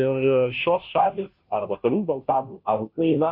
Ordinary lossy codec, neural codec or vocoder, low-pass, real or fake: MP3, 48 kbps; codec, 16 kHz, 0.5 kbps, FunCodec, trained on Chinese and English, 25 frames a second; 5.4 kHz; fake